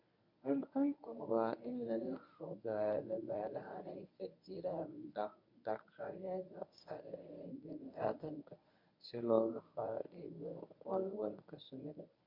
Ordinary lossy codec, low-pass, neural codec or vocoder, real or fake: MP3, 48 kbps; 5.4 kHz; codec, 24 kHz, 0.9 kbps, WavTokenizer, medium speech release version 1; fake